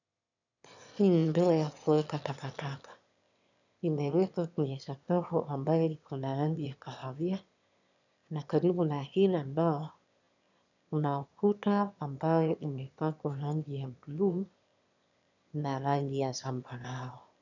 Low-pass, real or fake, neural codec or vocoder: 7.2 kHz; fake; autoencoder, 22.05 kHz, a latent of 192 numbers a frame, VITS, trained on one speaker